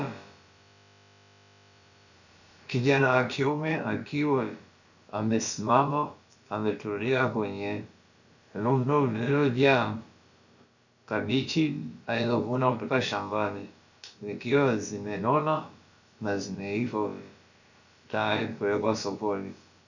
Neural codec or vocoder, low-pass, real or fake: codec, 16 kHz, about 1 kbps, DyCAST, with the encoder's durations; 7.2 kHz; fake